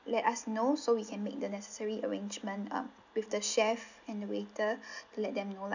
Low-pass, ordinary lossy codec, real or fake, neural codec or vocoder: 7.2 kHz; none; real; none